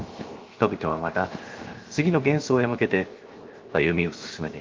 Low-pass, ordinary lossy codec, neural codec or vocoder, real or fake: 7.2 kHz; Opus, 24 kbps; codec, 16 kHz, 0.7 kbps, FocalCodec; fake